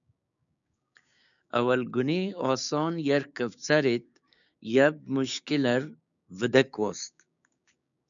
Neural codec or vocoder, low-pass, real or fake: codec, 16 kHz, 6 kbps, DAC; 7.2 kHz; fake